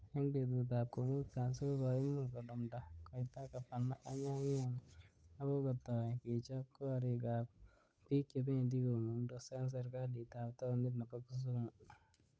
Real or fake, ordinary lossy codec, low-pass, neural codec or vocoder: fake; none; none; codec, 16 kHz, 8 kbps, FunCodec, trained on Chinese and English, 25 frames a second